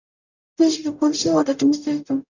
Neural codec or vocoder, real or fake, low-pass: codec, 44.1 kHz, 0.9 kbps, DAC; fake; 7.2 kHz